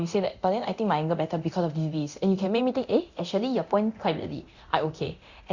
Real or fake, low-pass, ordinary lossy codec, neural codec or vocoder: fake; 7.2 kHz; Opus, 64 kbps; codec, 24 kHz, 0.9 kbps, DualCodec